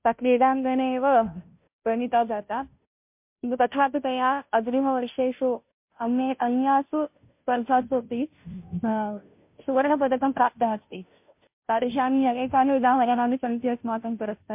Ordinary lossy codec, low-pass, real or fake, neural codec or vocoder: MP3, 32 kbps; 3.6 kHz; fake; codec, 16 kHz, 0.5 kbps, FunCodec, trained on Chinese and English, 25 frames a second